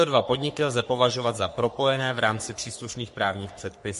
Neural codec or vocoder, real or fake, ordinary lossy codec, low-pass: codec, 44.1 kHz, 3.4 kbps, Pupu-Codec; fake; MP3, 48 kbps; 14.4 kHz